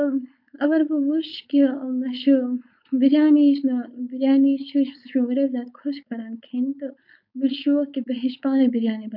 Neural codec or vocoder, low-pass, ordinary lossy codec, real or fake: codec, 16 kHz, 4.8 kbps, FACodec; 5.4 kHz; none; fake